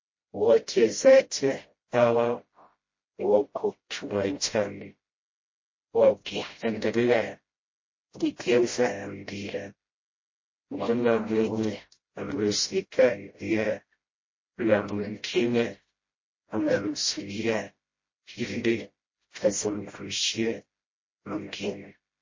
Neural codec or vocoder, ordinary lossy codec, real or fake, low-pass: codec, 16 kHz, 0.5 kbps, FreqCodec, smaller model; MP3, 32 kbps; fake; 7.2 kHz